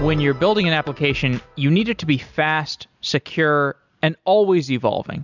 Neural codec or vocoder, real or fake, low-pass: none; real; 7.2 kHz